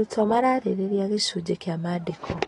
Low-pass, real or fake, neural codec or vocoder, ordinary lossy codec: 10.8 kHz; real; none; AAC, 32 kbps